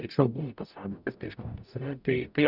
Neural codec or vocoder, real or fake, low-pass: codec, 44.1 kHz, 0.9 kbps, DAC; fake; 5.4 kHz